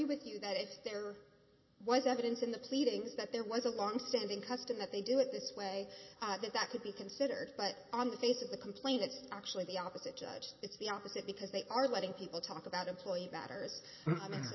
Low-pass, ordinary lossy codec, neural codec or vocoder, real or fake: 7.2 kHz; MP3, 24 kbps; none; real